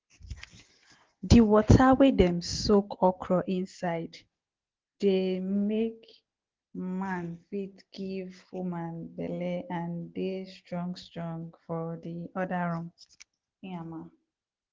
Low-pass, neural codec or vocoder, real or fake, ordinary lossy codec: 7.2 kHz; none; real; Opus, 16 kbps